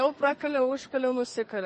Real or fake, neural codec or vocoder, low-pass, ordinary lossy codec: fake; codec, 32 kHz, 1.9 kbps, SNAC; 10.8 kHz; MP3, 32 kbps